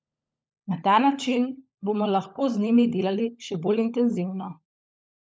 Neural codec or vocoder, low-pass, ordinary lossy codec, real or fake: codec, 16 kHz, 16 kbps, FunCodec, trained on LibriTTS, 50 frames a second; none; none; fake